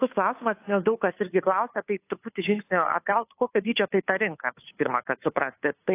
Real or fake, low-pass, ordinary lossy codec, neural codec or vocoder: fake; 3.6 kHz; AAC, 24 kbps; codec, 16 kHz, 2 kbps, FunCodec, trained on Chinese and English, 25 frames a second